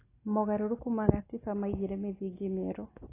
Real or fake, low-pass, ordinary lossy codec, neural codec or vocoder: real; 3.6 kHz; AAC, 16 kbps; none